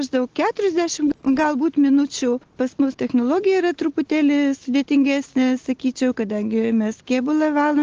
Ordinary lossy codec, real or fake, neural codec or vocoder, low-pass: Opus, 16 kbps; real; none; 7.2 kHz